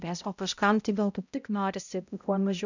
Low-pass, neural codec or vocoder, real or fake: 7.2 kHz; codec, 16 kHz, 0.5 kbps, X-Codec, HuBERT features, trained on balanced general audio; fake